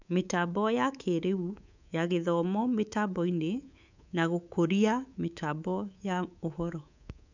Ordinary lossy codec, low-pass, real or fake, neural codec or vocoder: none; 7.2 kHz; fake; codec, 24 kHz, 3.1 kbps, DualCodec